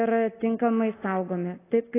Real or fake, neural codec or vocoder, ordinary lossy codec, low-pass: real; none; AAC, 16 kbps; 3.6 kHz